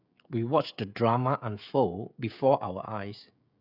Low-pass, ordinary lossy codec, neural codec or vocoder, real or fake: 5.4 kHz; none; codec, 16 kHz, 16 kbps, FreqCodec, smaller model; fake